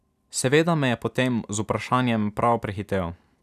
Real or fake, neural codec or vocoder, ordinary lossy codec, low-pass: real; none; none; 14.4 kHz